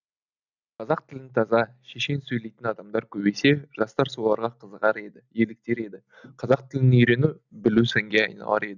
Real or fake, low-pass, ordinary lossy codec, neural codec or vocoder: real; 7.2 kHz; none; none